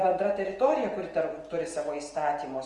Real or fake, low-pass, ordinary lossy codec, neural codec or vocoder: real; 10.8 kHz; Opus, 24 kbps; none